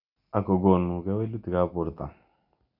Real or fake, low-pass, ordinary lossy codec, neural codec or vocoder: real; 5.4 kHz; none; none